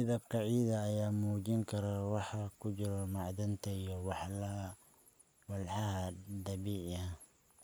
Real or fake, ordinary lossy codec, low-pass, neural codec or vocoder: real; none; none; none